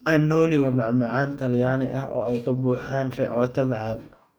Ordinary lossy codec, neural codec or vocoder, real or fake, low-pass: none; codec, 44.1 kHz, 2.6 kbps, DAC; fake; none